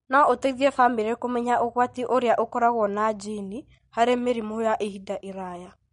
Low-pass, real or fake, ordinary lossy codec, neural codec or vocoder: 10.8 kHz; real; MP3, 48 kbps; none